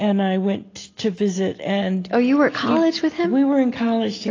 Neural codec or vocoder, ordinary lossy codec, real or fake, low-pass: none; AAC, 32 kbps; real; 7.2 kHz